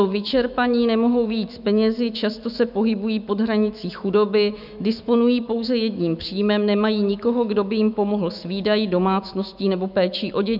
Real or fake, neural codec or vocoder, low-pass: fake; autoencoder, 48 kHz, 128 numbers a frame, DAC-VAE, trained on Japanese speech; 5.4 kHz